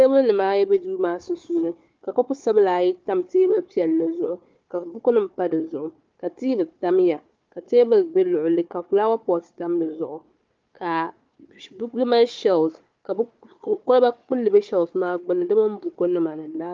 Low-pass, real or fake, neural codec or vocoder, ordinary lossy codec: 7.2 kHz; fake; codec, 16 kHz, 4 kbps, FunCodec, trained on Chinese and English, 50 frames a second; Opus, 24 kbps